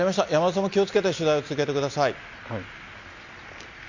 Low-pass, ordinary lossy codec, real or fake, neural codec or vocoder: 7.2 kHz; Opus, 64 kbps; real; none